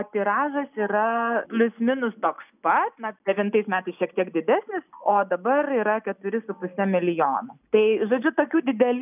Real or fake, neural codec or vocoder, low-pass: real; none; 3.6 kHz